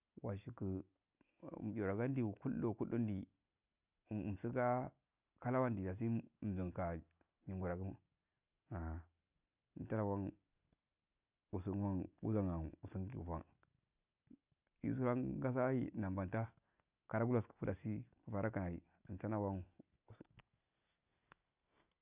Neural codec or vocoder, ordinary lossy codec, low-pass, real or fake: none; none; 3.6 kHz; real